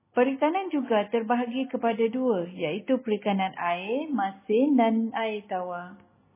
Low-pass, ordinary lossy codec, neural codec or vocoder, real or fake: 3.6 kHz; MP3, 16 kbps; none; real